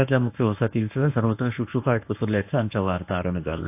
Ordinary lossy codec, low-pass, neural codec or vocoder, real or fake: AAC, 32 kbps; 3.6 kHz; codec, 24 kHz, 0.9 kbps, WavTokenizer, medium speech release version 1; fake